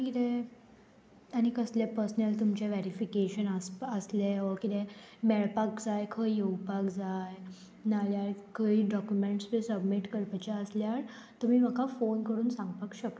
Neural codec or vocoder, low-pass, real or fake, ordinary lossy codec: none; none; real; none